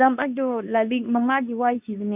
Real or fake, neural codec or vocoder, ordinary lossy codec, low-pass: fake; codec, 24 kHz, 0.9 kbps, WavTokenizer, medium speech release version 2; none; 3.6 kHz